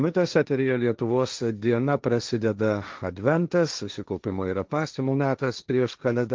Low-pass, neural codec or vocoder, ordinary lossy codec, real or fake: 7.2 kHz; codec, 16 kHz, 1.1 kbps, Voila-Tokenizer; Opus, 24 kbps; fake